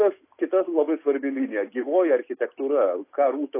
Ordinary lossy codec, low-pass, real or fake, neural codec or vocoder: AAC, 24 kbps; 3.6 kHz; real; none